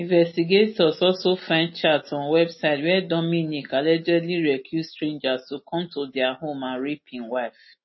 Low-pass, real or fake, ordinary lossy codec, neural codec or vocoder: 7.2 kHz; real; MP3, 24 kbps; none